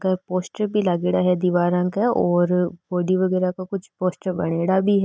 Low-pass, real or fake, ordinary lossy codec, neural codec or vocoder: none; real; none; none